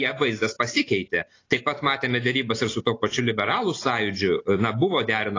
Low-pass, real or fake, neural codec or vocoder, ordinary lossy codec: 7.2 kHz; real; none; AAC, 32 kbps